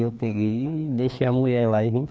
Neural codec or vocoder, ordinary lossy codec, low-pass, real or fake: codec, 16 kHz, 2 kbps, FreqCodec, larger model; none; none; fake